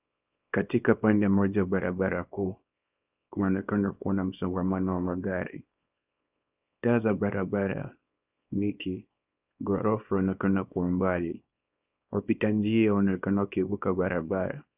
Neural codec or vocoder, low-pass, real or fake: codec, 24 kHz, 0.9 kbps, WavTokenizer, small release; 3.6 kHz; fake